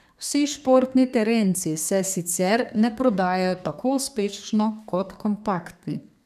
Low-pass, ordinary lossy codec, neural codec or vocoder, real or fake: 14.4 kHz; none; codec, 32 kHz, 1.9 kbps, SNAC; fake